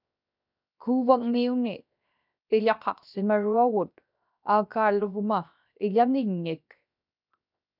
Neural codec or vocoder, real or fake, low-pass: codec, 16 kHz, 0.7 kbps, FocalCodec; fake; 5.4 kHz